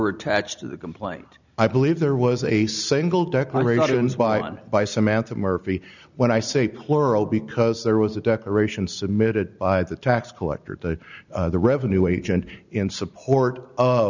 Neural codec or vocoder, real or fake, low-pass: none; real; 7.2 kHz